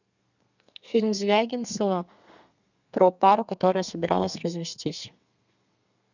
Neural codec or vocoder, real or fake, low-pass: codec, 32 kHz, 1.9 kbps, SNAC; fake; 7.2 kHz